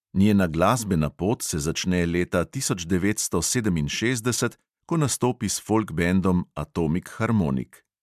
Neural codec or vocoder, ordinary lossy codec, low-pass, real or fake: none; MP3, 96 kbps; 14.4 kHz; real